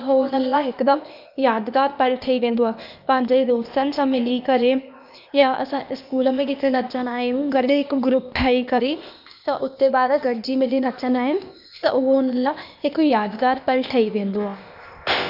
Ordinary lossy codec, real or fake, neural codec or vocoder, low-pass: none; fake; codec, 16 kHz, 0.8 kbps, ZipCodec; 5.4 kHz